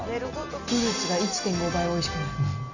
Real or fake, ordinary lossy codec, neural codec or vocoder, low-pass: real; none; none; 7.2 kHz